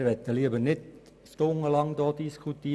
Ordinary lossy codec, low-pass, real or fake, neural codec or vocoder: none; none; real; none